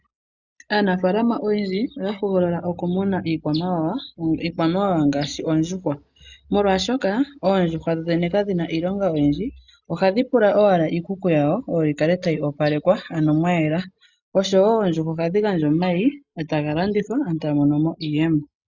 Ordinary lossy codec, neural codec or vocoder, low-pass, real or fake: Opus, 64 kbps; none; 7.2 kHz; real